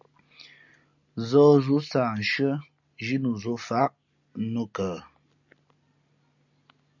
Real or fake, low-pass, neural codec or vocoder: real; 7.2 kHz; none